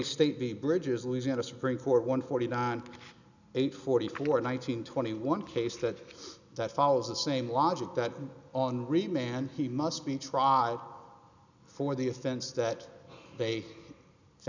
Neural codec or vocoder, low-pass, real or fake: none; 7.2 kHz; real